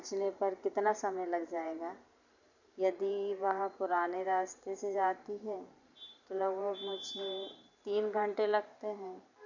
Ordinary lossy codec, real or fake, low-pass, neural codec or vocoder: none; fake; 7.2 kHz; vocoder, 44.1 kHz, 128 mel bands every 512 samples, BigVGAN v2